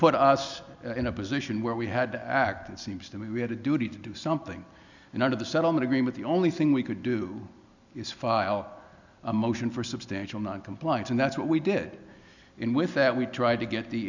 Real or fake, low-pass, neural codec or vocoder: real; 7.2 kHz; none